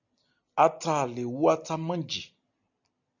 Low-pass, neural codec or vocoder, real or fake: 7.2 kHz; none; real